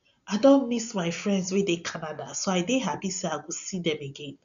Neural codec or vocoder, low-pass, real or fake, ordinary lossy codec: none; 7.2 kHz; real; none